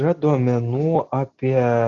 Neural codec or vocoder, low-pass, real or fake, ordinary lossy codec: none; 7.2 kHz; real; Opus, 64 kbps